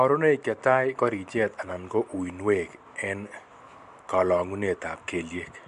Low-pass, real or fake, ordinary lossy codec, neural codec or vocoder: 10.8 kHz; real; MP3, 64 kbps; none